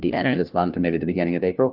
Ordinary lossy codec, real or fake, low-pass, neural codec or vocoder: Opus, 32 kbps; fake; 5.4 kHz; codec, 16 kHz, 1 kbps, FunCodec, trained on LibriTTS, 50 frames a second